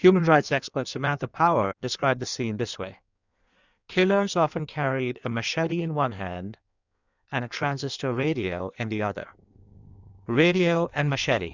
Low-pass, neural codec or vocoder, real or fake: 7.2 kHz; codec, 16 kHz in and 24 kHz out, 1.1 kbps, FireRedTTS-2 codec; fake